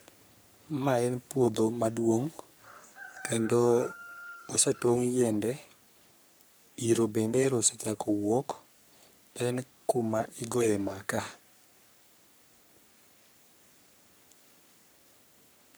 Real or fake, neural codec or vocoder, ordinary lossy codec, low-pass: fake; codec, 44.1 kHz, 3.4 kbps, Pupu-Codec; none; none